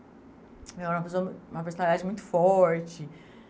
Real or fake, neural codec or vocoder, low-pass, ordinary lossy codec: real; none; none; none